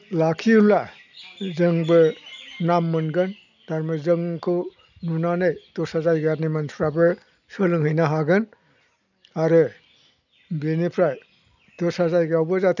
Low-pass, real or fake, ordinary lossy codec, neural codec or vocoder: 7.2 kHz; real; none; none